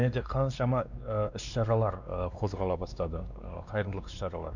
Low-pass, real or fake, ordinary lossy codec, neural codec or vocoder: 7.2 kHz; fake; none; codec, 16 kHz, 2 kbps, X-Codec, WavLM features, trained on Multilingual LibriSpeech